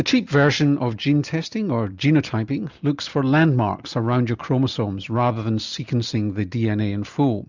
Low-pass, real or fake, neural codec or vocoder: 7.2 kHz; real; none